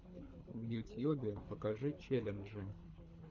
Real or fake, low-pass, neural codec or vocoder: fake; 7.2 kHz; codec, 24 kHz, 3 kbps, HILCodec